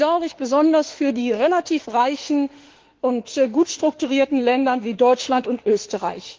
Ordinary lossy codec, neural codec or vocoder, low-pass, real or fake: Opus, 16 kbps; autoencoder, 48 kHz, 32 numbers a frame, DAC-VAE, trained on Japanese speech; 7.2 kHz; fake